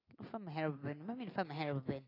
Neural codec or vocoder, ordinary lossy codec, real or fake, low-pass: none; AAC, 24 kbps; real; 5.4 kHz